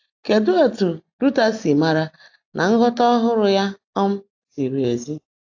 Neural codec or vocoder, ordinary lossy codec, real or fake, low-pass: none; AAC, 48 kbps; real; 7.2 kHz